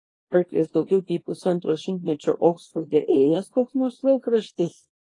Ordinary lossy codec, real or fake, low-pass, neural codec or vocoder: AAC, 32 kbps; fake; 10.8 kHz; codec, 24 kHz, 0.9 kbps, WavTokenizer, small release